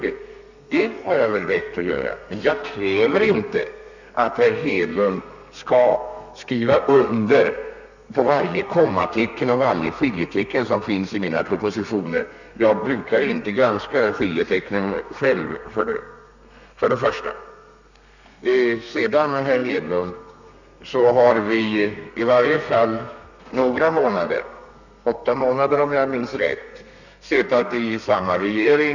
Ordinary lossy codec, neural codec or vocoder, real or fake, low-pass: none; codec, 32 kHz, 1.9 kbps, SNAC; fake; 7.2 kHz